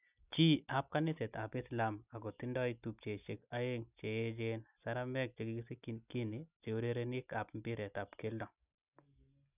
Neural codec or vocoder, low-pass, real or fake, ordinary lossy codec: none; 3.6 kHz; real; none